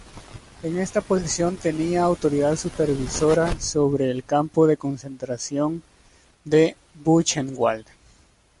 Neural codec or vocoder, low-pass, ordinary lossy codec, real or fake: none; 14.4 kHz; MP3, 48 kbps; real